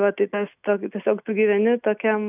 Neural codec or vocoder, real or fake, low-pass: none; real; 3.6 kHz